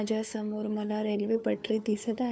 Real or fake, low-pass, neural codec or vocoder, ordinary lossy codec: fake; none; codec, 16 kHz, 4 kbps, FunCodec, trained on LibriTTS, 50 frames a second; none